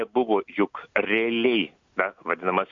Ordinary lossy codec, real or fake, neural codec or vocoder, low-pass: AAC, 64 kbps; real; none; 7.2 kHz